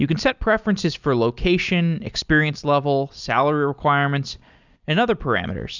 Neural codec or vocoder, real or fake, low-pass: none; real; 7.2 kHz